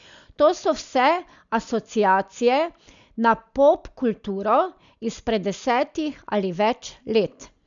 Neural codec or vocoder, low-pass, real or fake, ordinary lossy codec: none; 7.2 kHz; real; none